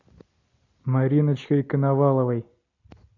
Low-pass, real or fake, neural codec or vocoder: 7.2 kHz; real; none